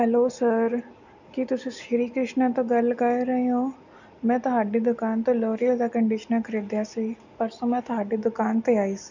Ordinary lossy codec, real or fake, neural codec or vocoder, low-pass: none; real; none; 7.2 kHz